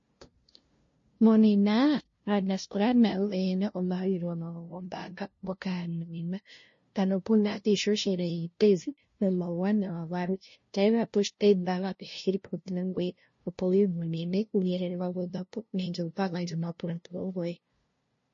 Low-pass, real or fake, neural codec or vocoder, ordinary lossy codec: 7.2 kHz; fake; codec, 16 kHz, 0.5 kbps, FunCodec, trained on LibriTTS, 25 frames a second; MP3, 32 kbps